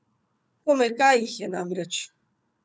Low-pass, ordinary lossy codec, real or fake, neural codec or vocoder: none; none; fake; codec, 16 kHz, 4 kbps, FunCodec, trained on Chinese and English, 50 frames a second